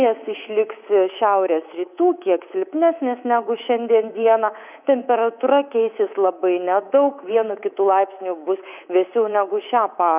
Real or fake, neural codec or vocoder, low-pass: fake; codec, 24 kHz, 3.1 kbps, DualCodec; 3.6 kHz